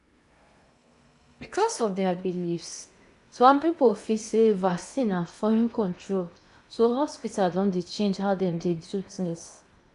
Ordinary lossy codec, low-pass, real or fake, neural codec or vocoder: none; 10.8 kHz; fake; codec, 16 kHz in and 24 kHz out, 0.8 kbps, FocalCodec, streaming, 65536 codes